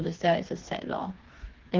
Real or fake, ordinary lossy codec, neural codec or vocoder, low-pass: fake; Opus, 16 kbps; codec, 16 kHz, 4 kbps, FreqCodec, smaller model; 7.2 kHz